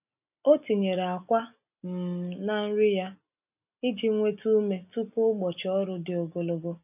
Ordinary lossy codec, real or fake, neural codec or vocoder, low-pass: none; real; none; 3.6 kHz